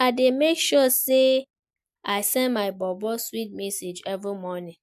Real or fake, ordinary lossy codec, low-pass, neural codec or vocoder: real; MP3, 96 kbps; 14.4 kHz; none